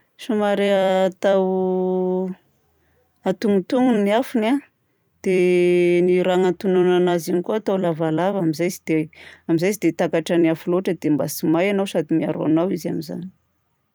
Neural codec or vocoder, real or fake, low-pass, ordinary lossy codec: vocoder, 44.1 kHz, 128 mel bands every 256 samples, BigVGAN v2; fake; none; none